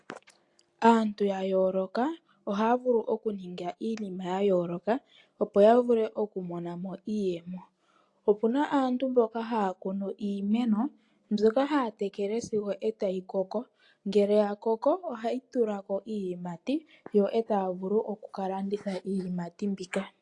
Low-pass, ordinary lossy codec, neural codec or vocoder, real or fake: 10.8 kHz; AAC, 48 kbps; none; real